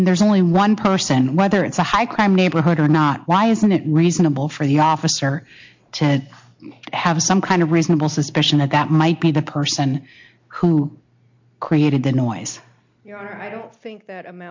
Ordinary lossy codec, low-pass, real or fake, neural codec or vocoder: MP3, 48 kbps; 7.2 kHz; real; none